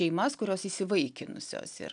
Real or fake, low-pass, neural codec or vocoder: real; 9.9 kHz; none